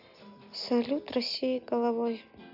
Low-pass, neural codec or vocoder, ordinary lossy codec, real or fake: 5.4 kHz; none; none; real